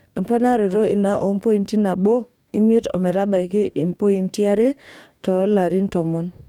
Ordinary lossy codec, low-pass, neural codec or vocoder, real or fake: none; 19.8 kHz; codec, 44.1 kHz, 2.6 kbps, DAC; fake